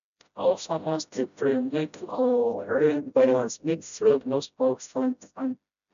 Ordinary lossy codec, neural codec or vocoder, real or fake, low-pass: none; codec, 16 kHz, 0.5 kbps, FreqCodec, smaller model; fake; 7.2 kHz